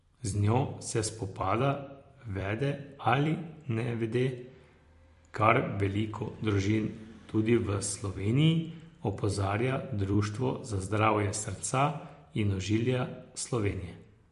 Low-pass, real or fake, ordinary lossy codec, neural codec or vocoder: 14.4 kHz; fake; MP3, 48 kbps; vocoder, 44.1 kHz, 128 mel bands every 256 samples, BigVGAN v2